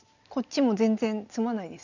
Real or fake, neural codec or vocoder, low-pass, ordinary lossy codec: real; none; 7.2 kHz; none